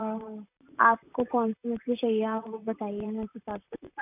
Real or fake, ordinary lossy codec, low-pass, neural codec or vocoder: fake; none; 3.6 kHz; vocoder, 44.1 kHz, 128 mel bands, Pupu-Vocoder